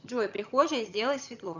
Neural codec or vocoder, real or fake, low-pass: vocoder, 22.05 kHz, 80 mel bands, HiFi-GAN; fake; 7.2 kHz